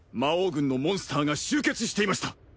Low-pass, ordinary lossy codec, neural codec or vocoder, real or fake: none; none; none; real